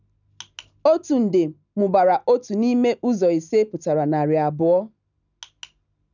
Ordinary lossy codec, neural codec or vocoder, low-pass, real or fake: none; none; 7.2 kHz; real